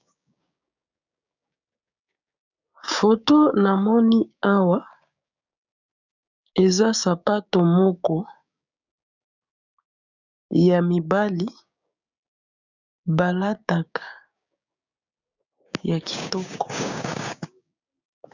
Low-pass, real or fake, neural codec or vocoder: 7.2 kHz; fake; codec, 16 kHz, 6 kbps, DAC